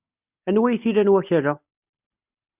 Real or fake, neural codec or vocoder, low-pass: real; none; 3.6 kHz